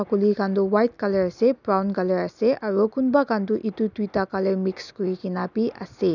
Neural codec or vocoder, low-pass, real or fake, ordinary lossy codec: none; 7.2 kHz; real; none